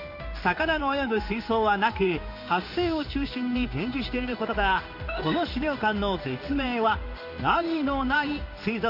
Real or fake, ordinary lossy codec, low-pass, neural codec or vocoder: fake; AAC, 48 kbps; 5.4 kHz; codec, 16 kHz in and 24 kHz out, 1 kbps, XY-Tokenizer